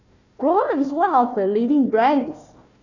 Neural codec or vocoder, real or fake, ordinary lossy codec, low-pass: codec, 16 kHz, 1 kbps, FunCodec, trained on Chinese and English, 50 frames a second; fake; none; 7.2 kHz